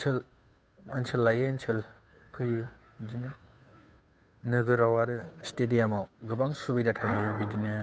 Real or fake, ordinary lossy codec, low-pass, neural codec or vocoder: fake; none; none; codec, 16 kHz, 2 kbps, FunCodec, trained on Chinese and English, 25 frames a second